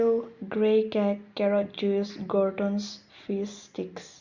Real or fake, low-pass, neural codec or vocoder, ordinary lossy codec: real; 7.2 kHz; none; Opus, 64 kbps